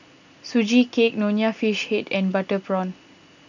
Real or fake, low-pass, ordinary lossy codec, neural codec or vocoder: real; 7.2 kHz; none; none